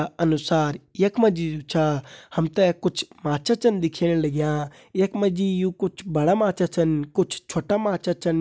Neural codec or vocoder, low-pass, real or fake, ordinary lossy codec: none; none; real; none